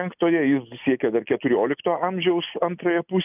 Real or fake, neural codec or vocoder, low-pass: real; none; 3.6 kHz